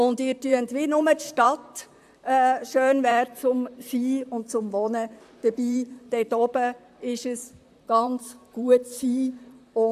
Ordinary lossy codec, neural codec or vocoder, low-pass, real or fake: AAC, 96 kbps; vocoder, 44.1 kHz, 128 mel bands, Pupu-Vocoder; 14.4 kHz; fake